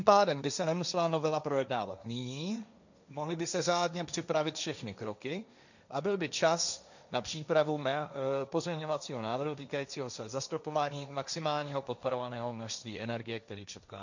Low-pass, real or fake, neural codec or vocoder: 7.2 kHz; fake; codec, 16 kHz, 1.1 kbps, Voila-Tokenizer